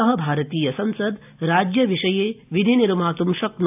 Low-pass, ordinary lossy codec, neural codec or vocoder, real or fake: 3.6 kHz; AAC, 32 kbps; none; real